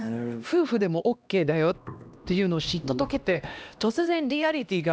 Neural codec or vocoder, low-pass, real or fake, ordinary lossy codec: codec, 16 kHz, 1 kbps, X-Codec, HuBERT features, trained on LibriSpeech; none; fake; none